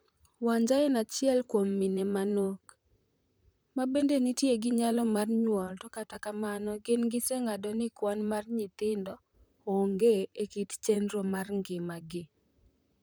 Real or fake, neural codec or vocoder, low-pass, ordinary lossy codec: fake; vocoder, 44.1 kHz, 128 mel bands, Pupu-Vocoder; none; none